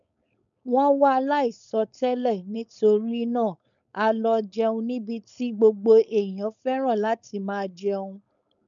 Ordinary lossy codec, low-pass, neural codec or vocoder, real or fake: none; 7.2 kHz; codec, 16 kHz, 4.8 kbps, FACodec; fake